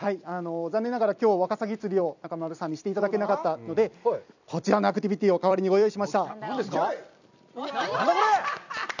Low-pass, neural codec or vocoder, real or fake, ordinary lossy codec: 7.2 kHz; vocoder, 44.1 kHz, 128 mel bands every 256 samples, BigVGAN v2; fake; none